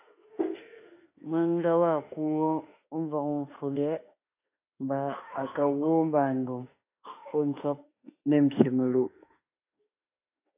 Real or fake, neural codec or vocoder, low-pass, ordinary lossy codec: fake; autoencoder, 48 kHz, 32 numbers a frame, DAC-VAE, trained on Japanese speech; 3.6 kHz; AAC, 32 kbps